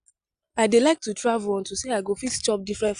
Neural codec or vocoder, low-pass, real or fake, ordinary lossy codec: none; 9.9 kHz; real; Opus, 64 kbps